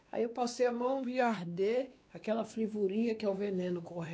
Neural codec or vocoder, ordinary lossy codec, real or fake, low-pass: codec, 16 kHz, 2 kbps, X-Codec, WavLM features, trained on Multilingual LibriSpeech; none; fake; none